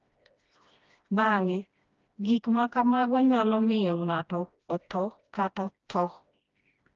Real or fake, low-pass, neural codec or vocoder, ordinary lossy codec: fake; 7.2 kHz; codec, 16 kHz, 1 kbps, FreqCodec, smaller model; Opus, 24 kbps